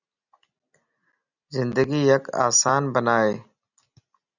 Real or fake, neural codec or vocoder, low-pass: real; none; 7.2 kHz